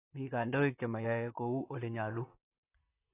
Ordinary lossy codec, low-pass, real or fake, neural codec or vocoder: AAC, 16 kbps; 3.6 kHz; fake; vocoder, 44.1 kHz, 128 mel bands, Pupu-Vocoder